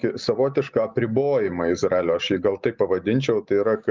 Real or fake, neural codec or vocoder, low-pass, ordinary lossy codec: real; none; 7.2 kHz; Opus, 24 kbps